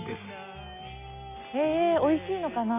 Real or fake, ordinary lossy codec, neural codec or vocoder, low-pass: real; none; none; 3.6 kHz